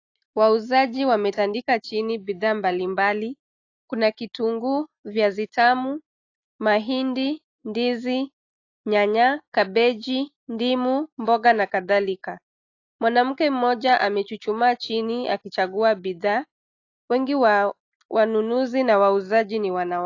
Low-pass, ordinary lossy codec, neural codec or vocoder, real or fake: 7.2 kHz; AAC, 48 kbps; none; real